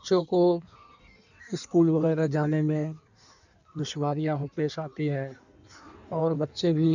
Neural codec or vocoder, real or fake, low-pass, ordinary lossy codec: codec, 16 kHz in and 24 kHz out, 1.1 kbps, FireRedTTS-2 codec; fake; 7.2 kHz; none